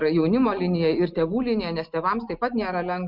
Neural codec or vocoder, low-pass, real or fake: none; 5.4 kHz; real